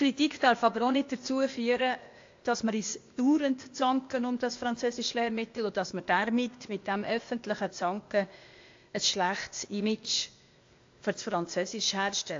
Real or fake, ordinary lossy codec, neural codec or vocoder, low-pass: fake; AAC, 48 kbps; codec, 16 kHz, 0.8 kbps, ZipCodec; 7.2 kHz